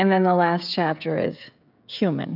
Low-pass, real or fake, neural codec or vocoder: 5.4 kHz; fake; codec, 16 kHz, 16 kbps, FreqCodec, smaller model